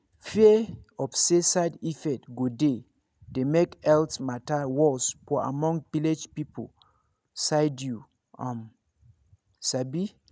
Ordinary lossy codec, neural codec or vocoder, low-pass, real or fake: none; none; none; real